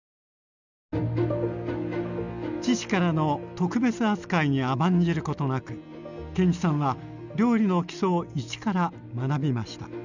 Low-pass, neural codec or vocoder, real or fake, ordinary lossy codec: 7.2 kHz; none; real; none